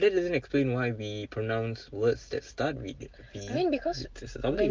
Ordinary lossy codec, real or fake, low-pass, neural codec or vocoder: Opus, 32 kbps; real; 7.2 kHz; none